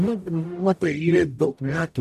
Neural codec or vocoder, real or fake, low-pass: codec, 44.1 kHz, 0.9 kbps, DAC; fake; 14.4 kHz